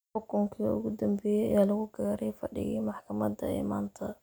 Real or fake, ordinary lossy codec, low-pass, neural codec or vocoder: real; none; none; none